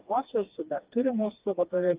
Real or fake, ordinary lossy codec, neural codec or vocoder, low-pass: fake; Opus, 32 kbps; codec, 16 kHz, 2 kbps, FreqCodec, smaller model; 3.6 kHz